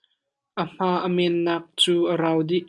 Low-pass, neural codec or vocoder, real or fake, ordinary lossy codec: 10.8 kHz; none; real; MP3, 96 kbps